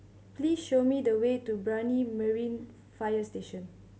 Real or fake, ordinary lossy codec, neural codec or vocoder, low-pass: real; none; none; none